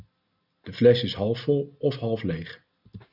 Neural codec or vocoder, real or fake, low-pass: none; real; 5.4 kHz